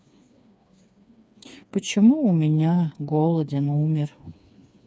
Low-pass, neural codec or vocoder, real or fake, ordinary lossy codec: none; codec, 16 kHz, 4 kbps, FreqCodec, smaller model; fake; none